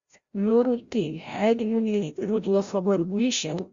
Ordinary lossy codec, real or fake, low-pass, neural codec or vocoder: Opus, 64 kbps; fake; 7.2 kHz; codec, 16 kHz, 0.5 kbps, FreqCodec, larger model